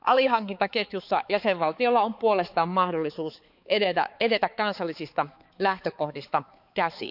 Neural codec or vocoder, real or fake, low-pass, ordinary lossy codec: codec, 16 kHz, 4 kbps, X-Codec, HuBERT features, trained on balanced general audio; fake; 5.4 kHz; none